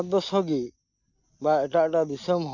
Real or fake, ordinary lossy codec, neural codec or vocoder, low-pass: real; none; none; 7.2 kHz